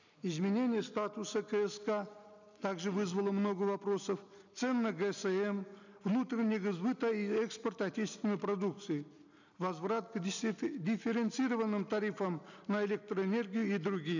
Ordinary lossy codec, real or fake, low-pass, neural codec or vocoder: none; real; 7.2 kHz; none